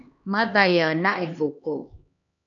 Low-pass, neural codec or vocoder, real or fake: 7.2 kHz; codec, 16 kHz, 2 kbps, X-Codec, HuBERT features, trained on LibriSpeech; fake